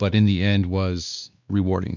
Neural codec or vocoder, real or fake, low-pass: codec, 16 kHz, 2 kbps, X-Codec, WavLM features, trained on Multilingual LibriSpeech; fake; 7.2 kHz